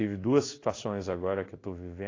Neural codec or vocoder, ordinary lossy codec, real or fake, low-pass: codec, 16 kHz in and 24 kHz out, 1 kbps, XY-Tokenizer; AAC, 32 kbps; fake; 7.2 kHz